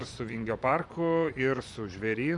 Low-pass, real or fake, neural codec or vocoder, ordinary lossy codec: 10.8 kHz; fake; vocoder, 24 kHz, 100 mel bands, Vocos; MP3, 96 kbps